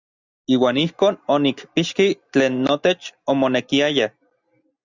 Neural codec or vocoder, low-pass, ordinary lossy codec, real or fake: none; 7.2 kHz; Opus, 64 kbps; real